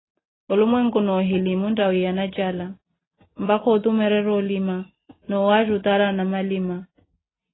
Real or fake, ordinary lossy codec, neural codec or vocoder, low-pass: real; AAC, 16 kbps; none; 7.2 kHz